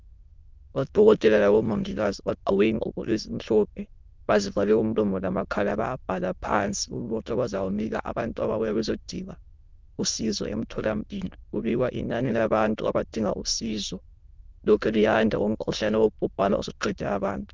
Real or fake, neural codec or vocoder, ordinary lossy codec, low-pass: fake; autoencoder, 22.05 kHz, a latent of 192 numbers a frame, VITS, trained on many speakers; Opus, 32 kbps; 7.2 kHz